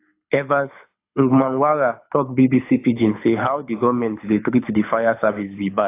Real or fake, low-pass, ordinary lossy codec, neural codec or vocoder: fake; 3.6 kHz; AAC, 24 kbps; codec, 24 kHz, 6 kbps, HILCodec